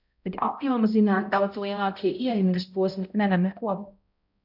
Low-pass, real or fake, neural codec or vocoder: 5.4 kHz; fake; codec, 16 kHz, 0.5 kbps, X-Codec, HuBERT features, trained on balanced general audio